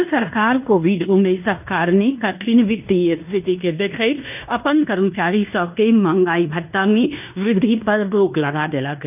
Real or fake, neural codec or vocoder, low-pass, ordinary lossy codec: fake; codec, 16 kHz in and 24 kHz out, 0.9 kbps, LongCat-Audio-Codec, fine tuned four codebook decoder; 3.6 kHz; none